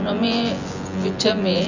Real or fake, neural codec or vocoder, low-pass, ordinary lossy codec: fake; vocoder, 24 kHz, 100 mel bands, Vocos; 7.2 kHz; none